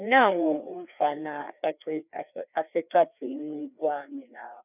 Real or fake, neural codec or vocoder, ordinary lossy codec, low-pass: fake; codec, 16 kHz, 2 kbps, FreqCodec, larger model; none; 3.6 kHz